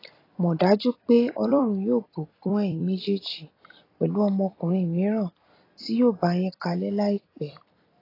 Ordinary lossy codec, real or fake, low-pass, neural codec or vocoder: AAC, 24 kbps; fake; 5.4 kHz; vocoder, 44.1 kHz, 128 mel bands every 256 samples, BigVGAN v2